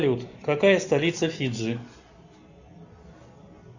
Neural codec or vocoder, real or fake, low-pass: none; real; 7.2 kHz